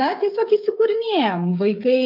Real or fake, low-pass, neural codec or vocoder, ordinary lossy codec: fake; 5.4 kHz; codec, 16 kHz, 8 kbps, FreqCodec, smaller model; MP3, 48 kbps